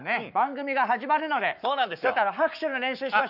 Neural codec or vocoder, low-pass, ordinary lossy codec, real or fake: codec, 44.1 kHz, 7.8 kbps, Pupu-Codec; 5.4 kHz; none; fake